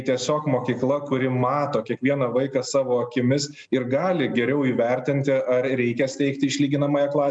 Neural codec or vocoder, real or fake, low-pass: none; real; 9.9 kHz